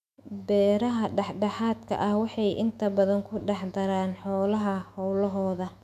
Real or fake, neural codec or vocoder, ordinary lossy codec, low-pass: fake; autoencoder, 48 kHz, 128 numbers a frame, DAC-VAE, trained on Japanese speech; none; 14.4 kHz